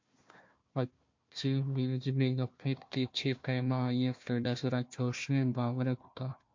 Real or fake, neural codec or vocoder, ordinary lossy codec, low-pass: fake; codec, 16 kHz, 1 kbps, FunCodec, trained on Chinese and English, 50 frames a second; MP3, 48 kbps; 7.2 kHz